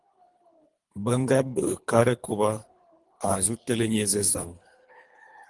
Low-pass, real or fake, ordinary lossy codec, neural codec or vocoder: 10.8 kHz; fake; Opus, 24 kbps; codec, 24 kHz, 3 kbps, HILCodec